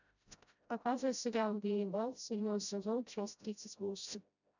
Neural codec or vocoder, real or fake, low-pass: codec, 16 kHz, 0.5 kbps, FreqCodec, smaller model; fake; 7.2 kHz